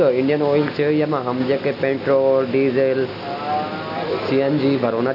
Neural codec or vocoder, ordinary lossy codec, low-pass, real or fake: none; none; 5.4 kHz; real